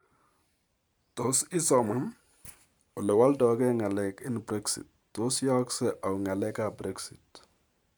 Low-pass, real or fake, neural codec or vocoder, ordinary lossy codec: none; fake; vocoder, 44.1 kHz, 128 mel bands every 512 samples, BigVGAN v2; none